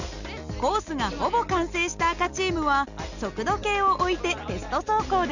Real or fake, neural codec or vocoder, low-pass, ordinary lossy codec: real; none; 7.2 kHz; none